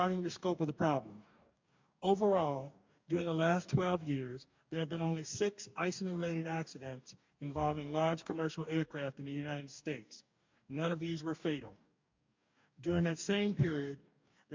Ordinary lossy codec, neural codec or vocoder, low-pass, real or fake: MP3, 64 kbps; codec, 44.1 kHz, 2.6 kbps, DAC; 7.2 kHz; fake